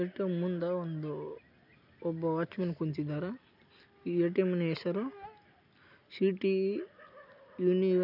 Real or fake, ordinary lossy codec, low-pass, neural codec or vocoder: real; none; 5.4 kHz; none